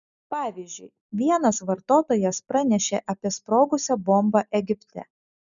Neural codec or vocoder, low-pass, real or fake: none; 7.2 kHz; real